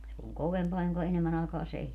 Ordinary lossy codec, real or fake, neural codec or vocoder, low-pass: none; real; none; 14.4 kHz